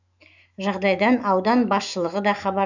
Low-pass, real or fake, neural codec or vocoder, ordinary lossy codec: 7.2 kHz; fake; autoencoder, 48 kHz, 128 numbers a frame, DAC-VAE, trained on Japanese speech; none